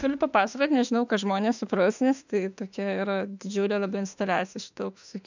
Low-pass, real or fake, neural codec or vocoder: 7.2 kHz; fake; autoencoder, 48 kHz, 32 numbers a frame, DAC-VAE, trained on Japanese speech